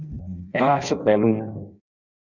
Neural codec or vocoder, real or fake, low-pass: codec, 16 kHz in and 24 kHz out, 0.6 kbps, FireRedTTS-2 codec; fake; 7.2 kHz